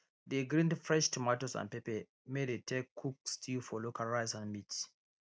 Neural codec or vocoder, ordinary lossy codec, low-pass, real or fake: none; none; none; real